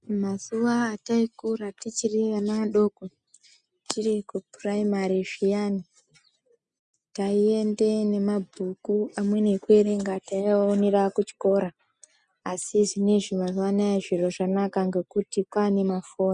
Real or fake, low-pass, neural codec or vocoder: real; 9.9 kHz; none